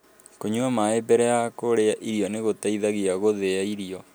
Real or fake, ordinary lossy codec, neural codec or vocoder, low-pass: real; none; none; none